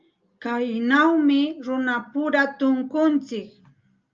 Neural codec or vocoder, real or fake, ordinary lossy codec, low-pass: none; real; Opus, 24 kbps; 7.2 kHz